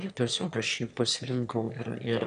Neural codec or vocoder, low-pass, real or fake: autoencoder, 22.05 kHz, a latent of 192 numbers a frame, VITS, trained on one speaker; 9.9 kHz; fake